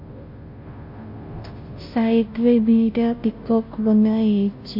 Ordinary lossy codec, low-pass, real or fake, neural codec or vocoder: MP3, 32 kbps; 5.4 kHz; fake; codec, 16 kHz, 0.5 kbps, FunCodec, trained on Chinese and English, 25 frames a second